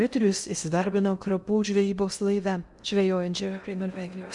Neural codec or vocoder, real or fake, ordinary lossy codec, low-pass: codec, 16 kHz in and 24 kHz out, 0.6 kbps, FocalCodec, streaming, 2048 codes; fake; Opus, 64 kbps; 10.8 kHz